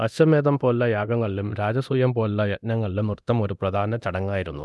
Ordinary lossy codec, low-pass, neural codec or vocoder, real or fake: none; none; codec, 24 kHz, 0.9 kbps, DualCodec; fake